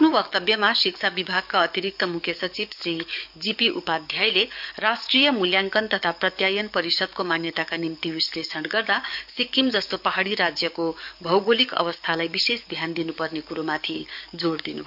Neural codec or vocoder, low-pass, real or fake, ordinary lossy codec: codec, 44.1 kHz, 7.8 kbps, DAC; 5.4 kHz; fake; none